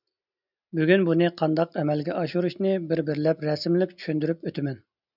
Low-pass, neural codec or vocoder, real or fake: 5.4 kHz; none; real